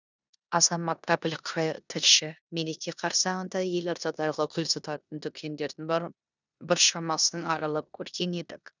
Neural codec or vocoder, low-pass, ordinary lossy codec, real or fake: codec, 16 kHz in and 24 kHz out, 0.9 kbps, LongCat-Audio-Codec, four codebook decoder; 7.2 kHz; none; fake